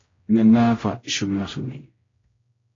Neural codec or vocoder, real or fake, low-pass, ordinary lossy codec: codec, 16 kHz, 0.5 kbps, X-Codec, HuBERT features, trained on general audio; fake; 7.2 kHz; AAC, 32 kbps